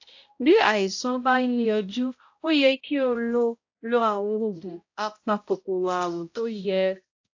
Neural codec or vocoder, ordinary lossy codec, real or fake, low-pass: codec, 16 kHz, 0.5 kbps, X-Codec, HuBERT features, trained on balanced general audio; AAC, 48 kbps; fake; 7.2 kHz